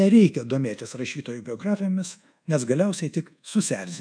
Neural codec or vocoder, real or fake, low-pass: codec, 24 kHz, 1.2 kbps, DualCodec; fake; 9.9 kHz